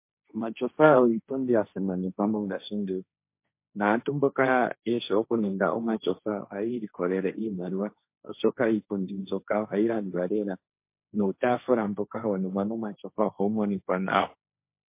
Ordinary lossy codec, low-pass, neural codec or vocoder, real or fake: MP3, 24 kbps; 3.6 kHz; codec, 16 kHz, 1.1 kbps, Voila-Tokenizer; fake